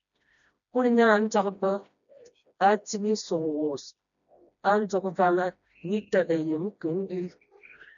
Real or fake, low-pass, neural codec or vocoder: fake; 7.2 kHz; codec, 16 kHz, 1 kbps, FreqCodec, smaller model